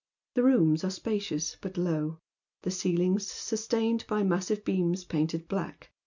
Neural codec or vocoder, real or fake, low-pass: none; real; 7.2 kHz